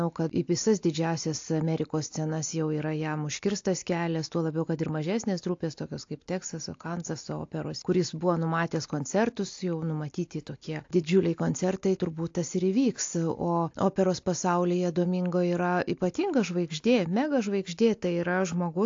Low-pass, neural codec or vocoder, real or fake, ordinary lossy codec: 7.2 kHz; none; real; AAC, 48 kbps